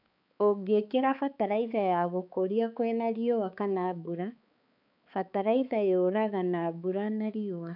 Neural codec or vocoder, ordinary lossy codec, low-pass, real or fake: codec, 16 kHz, 4 kbps, X-Codec, HuBERT features, trained on balanced general audio; none; 5.4 kHz; fake